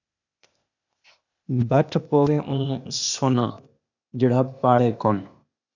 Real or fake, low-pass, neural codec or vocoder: fake; 7.2 kHz; codec, 16 kHz, 0.8 kbps, ZipCodec